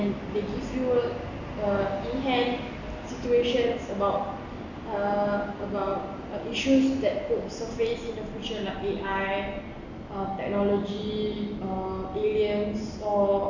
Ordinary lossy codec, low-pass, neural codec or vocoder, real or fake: none; 7.2 kHz; none; real